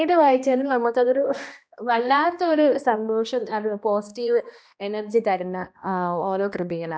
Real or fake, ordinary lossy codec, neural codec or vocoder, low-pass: fake; none; codec, 16 kHz, 1 kbps, X-Codec, HuBERT features, trained on balanced general audio; none